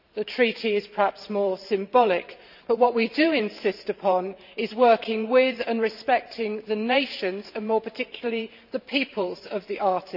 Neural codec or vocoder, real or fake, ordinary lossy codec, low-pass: vocoder, 44.1 kHz, 128 mel bands every 512 samples, BigVGAN v2; fake; none; 5.4 kHz